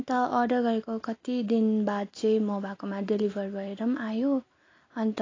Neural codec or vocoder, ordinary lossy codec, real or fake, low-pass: none; AAC, 32 kbps; real; 7.2 kHz